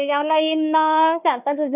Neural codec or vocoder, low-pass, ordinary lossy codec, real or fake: codec, 16 kHz, 1 kbps, FunCodec, trained on Chinese and English, 50 frames a second; 3.6 kHz; none; fake